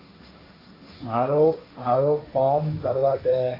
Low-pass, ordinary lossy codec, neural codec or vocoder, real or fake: 5.4 kHz; AAC, 24 kbps; codec, 16 kHz, 1.1 kbps, Voila-Tokenizer; fake